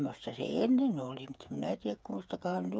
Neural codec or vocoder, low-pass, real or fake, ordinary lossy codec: codec, 16 kHz, 8 kbps, FreqCodec, smaller model; none; fake; none